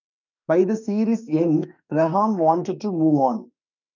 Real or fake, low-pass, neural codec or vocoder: fake; 7.2 kHz; autoencoder, 48 kHz, 32 numbers a frame, DAC-VAE, trained on Japanese speech